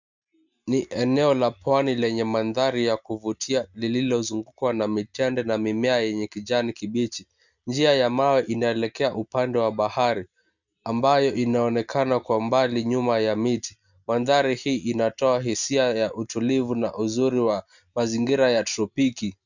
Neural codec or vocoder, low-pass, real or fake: none; 7.2 kHz; real